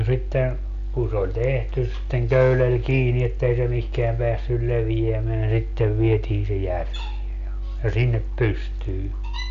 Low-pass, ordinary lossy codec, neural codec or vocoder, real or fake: 7.2 kHz; none; none; real